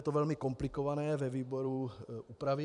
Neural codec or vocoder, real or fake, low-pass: vocoder, 44.1 kHz, 128 mel bands every 256 samples, BigVGAN v2; fake; 10.8 kHz